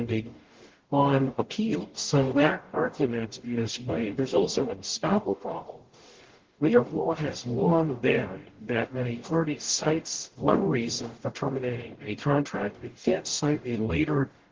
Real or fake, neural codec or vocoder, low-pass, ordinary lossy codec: fake; codec, 44.1 kHz, 0.9 kbps, DAC; 7.2 kHz; Opus, 16 kbps